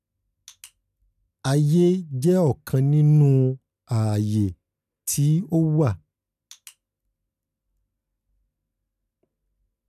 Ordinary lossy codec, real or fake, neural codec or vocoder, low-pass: none; real; none; 14.4 kHz